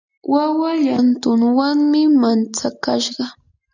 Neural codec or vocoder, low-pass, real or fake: none; 7.2 kHz; real